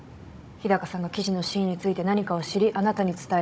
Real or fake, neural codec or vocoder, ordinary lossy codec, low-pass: fake; codec, 16 kHz, 16 kbps, FunCodec, trained on Chinese and English, 50 frames a second; none; none